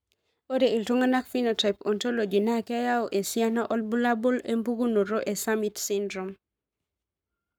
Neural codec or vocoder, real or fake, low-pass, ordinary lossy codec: codec, 44.1 kHz, 7.8 kbps, Pupu-Codec; fake; none; none